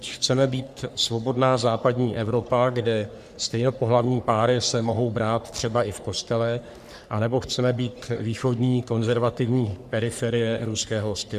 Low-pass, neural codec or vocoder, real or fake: 14.4 kHz; codec, 44.1 kHz, 3.4 kbps, Pupu-Codec; fake